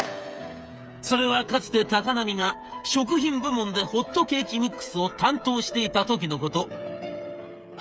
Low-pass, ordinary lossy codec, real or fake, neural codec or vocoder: none; none; fake; codec, 16 kHz, 8 kbps, FreqCodec, smaller model